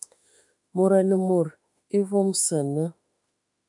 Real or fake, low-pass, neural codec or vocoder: fake; 10.8 kHz; autoencoder, 48 kHz, 32 numbers a frame, DAC-VAE, trained on Japanese speech